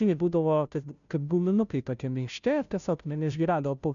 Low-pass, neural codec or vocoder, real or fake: 7.2 kHz; codec, 16 kHz, 0.5 kbps, FunCodec, trained on Chinese and English, 25 frames a second; fake